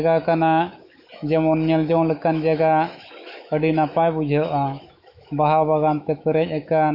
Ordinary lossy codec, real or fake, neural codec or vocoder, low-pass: AAC, 48 kbps; fake; autoencoder, 48 kHz, 128 numbers a frame, DAC-VAE, trained on Japanese speech; 5.4 kHz